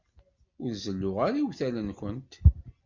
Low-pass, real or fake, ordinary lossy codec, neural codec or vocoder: 7.2 kHz; real; MP3, 64 kbps; none